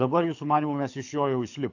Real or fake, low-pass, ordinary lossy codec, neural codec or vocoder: fake; 7.2 kHz; AAC, 48 kbps; codec, 24 kHz, 6 kbps, HILCodec